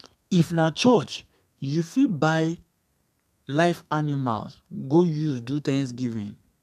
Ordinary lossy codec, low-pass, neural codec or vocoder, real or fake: none; 14.4 kHz; codec, 32 kHz, 1.9 kbps, SNAC; fake